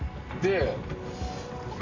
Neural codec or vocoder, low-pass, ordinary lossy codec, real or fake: none; 7.2 kHz; none; real